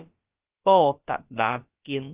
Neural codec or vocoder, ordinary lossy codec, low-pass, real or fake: codec, 16 kHz, about 1 kbps, DyCAST, with the encoder's durations; Opus, 24 kbps; 3.6 kHz; fake